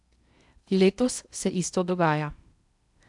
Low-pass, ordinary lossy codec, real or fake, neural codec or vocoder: 10.8 kHz; none; fake; codec, 16 kHz in and 24 kHz out, 0.8 kbps, FocalCodec, streaming, 65536 codes